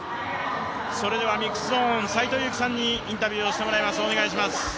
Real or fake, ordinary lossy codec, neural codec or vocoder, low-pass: real; none; none; none